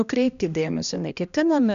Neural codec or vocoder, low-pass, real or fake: codec, 16 kHz, 1 kbps, X-Codec, HuBERT features, trained on balanced general audio; 7.2 kHz; fake